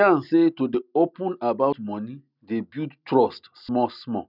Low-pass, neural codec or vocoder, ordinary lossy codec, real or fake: 5.4 kHz; none; none; real